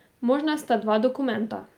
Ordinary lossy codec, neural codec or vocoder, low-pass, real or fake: Opus, 32 kbps; none; 19.8 kHz; real